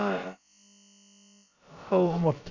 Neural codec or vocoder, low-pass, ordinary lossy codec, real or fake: codec, 16 kHz, about 1 kbps, DyCAST, with the encoder's durations; 7.2 kHz; none; fake